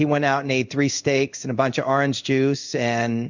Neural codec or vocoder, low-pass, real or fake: codec, 16 kHz in and 24 kHz out, 1 kbps, XY-Tokenizer; 7.2 kHz; fake